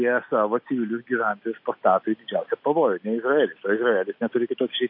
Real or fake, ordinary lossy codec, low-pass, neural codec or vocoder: real; MP3, 32 kbps; 5.4 kHz; none